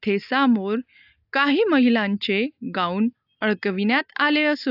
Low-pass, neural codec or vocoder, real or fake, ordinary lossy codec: 5.4 kHz; none; real; AAC, 48 kbps